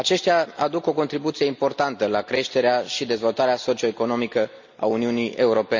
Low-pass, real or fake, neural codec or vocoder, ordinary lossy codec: 7.2 kHz; real; none; MP3, 64 kbps